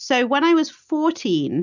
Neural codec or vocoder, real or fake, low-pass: none; real; 7.2 kHz